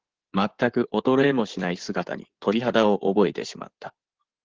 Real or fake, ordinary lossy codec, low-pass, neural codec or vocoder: fake; Opus, 16 kbps; 7.2 kHz; codec, 16 kHz in and 24 kHz out, 2.2 kbps, FireRedTTS-2 codec